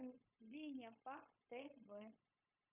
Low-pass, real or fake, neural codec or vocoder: 3.6 kHz; fake; codec, 16 kHz, 0.9 kbps, LongCat-Audio-Codec